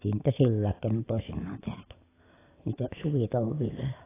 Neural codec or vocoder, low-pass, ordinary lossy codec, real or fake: none; 3.6 kHz; AAC, 16 kbps; real